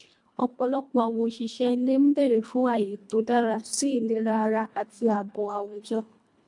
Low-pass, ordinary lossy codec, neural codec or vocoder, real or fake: 10.8 kHz; MP3, 64 kbps; codec, 24 kHz, 1.5 kbps, HILCodec; fake